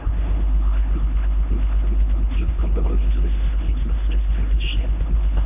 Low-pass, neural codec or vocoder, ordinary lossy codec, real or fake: 3.6 kHz; codec, 16 kHz, 1 kbps, X-Codec, HuBERT features, trained on LibriSpeech; none; fake